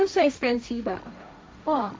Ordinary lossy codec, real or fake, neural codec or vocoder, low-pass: none; fake; codec, 16 kHz, 1.1 kbps, Voila-Tokenizer; none